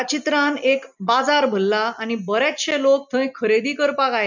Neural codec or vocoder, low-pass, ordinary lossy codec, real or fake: none; 7.2 kHz; none; real